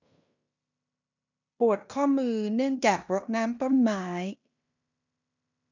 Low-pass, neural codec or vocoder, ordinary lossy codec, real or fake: 7.2 kHz; codec, 16 kHz in and 24 kHz out, 0.9 kbps, LongCat-Audio-Codec, fine tuned four codebook decoder; none; fake